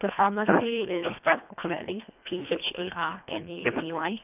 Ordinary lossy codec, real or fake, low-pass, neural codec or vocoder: none; fake; 3.6 kHz; codec, 24 kHz, 1.5 kbps, HILCodec